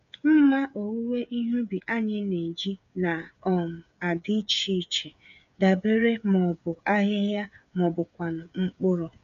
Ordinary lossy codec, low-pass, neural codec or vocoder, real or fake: none; 7.2 kHz; codec, 16 kHz, 8 kbps, FreqCodec, smaller model; fake